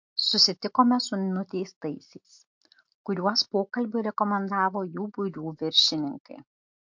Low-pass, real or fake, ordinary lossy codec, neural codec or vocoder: 7.2 kHz; real; MP3, 48 kbps; none